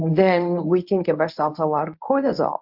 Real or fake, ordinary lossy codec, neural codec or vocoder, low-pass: fake; AAC, 48 kbps; codec, 24 kHz, 0.9 kbps, WavTokenizer, medium speech release version 1; 5.4 kHz